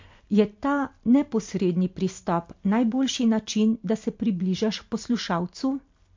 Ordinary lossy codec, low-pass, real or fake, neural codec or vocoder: MP3, 48 kbps; 7.2 kHz; real; none